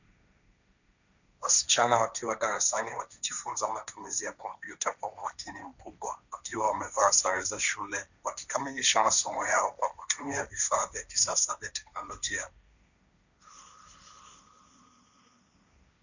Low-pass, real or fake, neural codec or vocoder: 7.2 kHz; fake; codec, 16 kHz, 1.1 kbps, Voila-Tokenizer